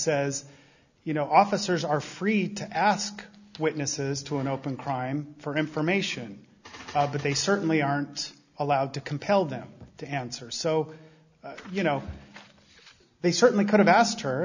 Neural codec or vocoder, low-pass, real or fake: none; 7.2 kHz; real